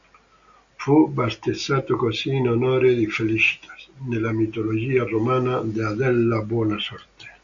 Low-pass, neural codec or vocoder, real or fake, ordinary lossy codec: 7.2 kHz; none; real; Opus, 64 kbps